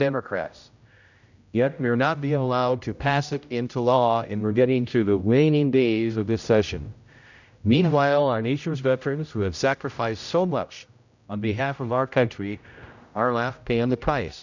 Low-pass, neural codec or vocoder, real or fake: 7.2 kHz; codec, 16 kHz, 0.5 kbps, X-Codec, HuBERT features, trained on general audio; fake